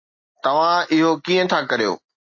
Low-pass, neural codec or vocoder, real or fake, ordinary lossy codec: 7.2 kHz; none; real; MP3, 32 kbps